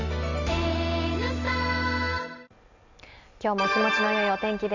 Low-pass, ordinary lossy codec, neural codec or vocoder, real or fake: 7.2 kHz; none; none; real